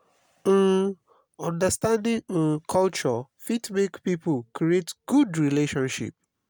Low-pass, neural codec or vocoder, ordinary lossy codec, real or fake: none; none; none; real